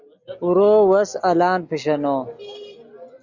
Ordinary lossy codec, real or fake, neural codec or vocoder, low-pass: Opus, 64 kbps; real; none; 7.2 kHz